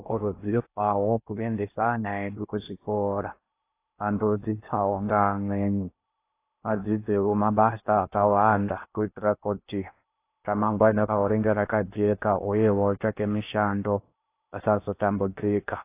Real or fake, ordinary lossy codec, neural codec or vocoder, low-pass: fake; AAC, 24 kbps; codec, 16 kHz in and 24 kHz out, 0.6 kbps, FocalCodec, streaming, 2048 codes; 3.6 kHz